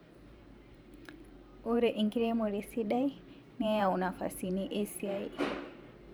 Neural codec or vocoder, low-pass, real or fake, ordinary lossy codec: vocoder, 44.1 kHz, 128 mel bands every 512 samples, BigVGAN v2; none; fake; none